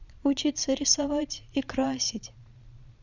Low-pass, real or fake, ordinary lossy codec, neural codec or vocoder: 7.2 kHz; fake; none; vocoder, 22.05 kHz, 80 mel bands, Vocos